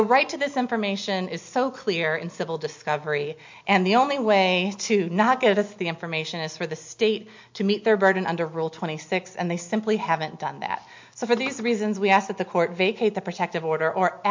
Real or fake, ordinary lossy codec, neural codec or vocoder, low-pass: real; MP3, 48 kbps; none; 7.2 kHz